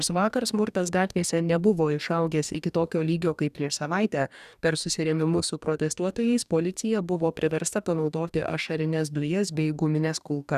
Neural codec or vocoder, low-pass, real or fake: codec, 44.1 kHz, 2.6 kbps, DAC; 14.4 kHz; fake